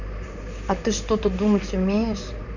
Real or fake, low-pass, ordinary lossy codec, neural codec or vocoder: fake; 7.2 kHz; none; vocoder, 44.1 kHz, 128 mel bands, Pupu-Vocoder